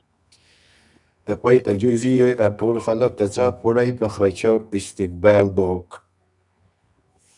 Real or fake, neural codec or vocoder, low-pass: fake; codec, 24 kHz, 0.9 kbps, WavTokenizer, medium music audio release; 10.8 kHz